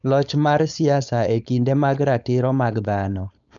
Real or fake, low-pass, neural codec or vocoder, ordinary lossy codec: fake; 7.2 kHz; codec, 16 kHz, 4.8 kbps, FACodec; none